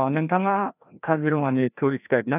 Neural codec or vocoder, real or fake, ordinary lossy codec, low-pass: codec, 16 kHz, 1 kbps, FreqCodec, larger model; fake; none; 3.6 kHz